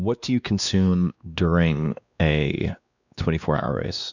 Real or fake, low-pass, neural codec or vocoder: fake; 7.2 kHz; codec, 16 kHz, 2 kbps, X-Codec, WavLM features, trained on Multilingual LibriSpeech